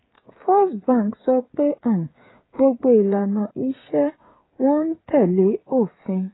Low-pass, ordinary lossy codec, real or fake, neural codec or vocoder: 7.2 kHz; AAC, 16 kbps; fake; codec, 16 kHz, 8 kbps, FreqCodec, smaller model